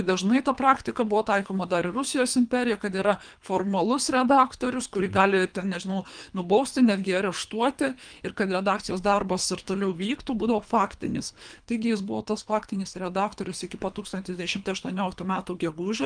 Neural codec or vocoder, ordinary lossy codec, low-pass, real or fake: codec, 24 kHz, 3 kbps, HILCodec; Opus, 32 kbps; 9.9 kHz; fake